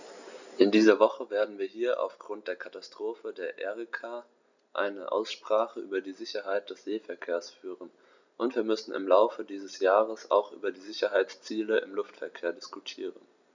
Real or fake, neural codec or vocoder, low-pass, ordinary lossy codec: real; none; 7.2 kHz; none